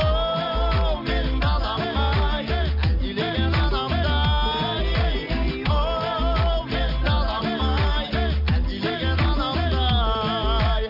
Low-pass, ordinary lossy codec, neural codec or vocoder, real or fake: 5.4 kHz; none; none; real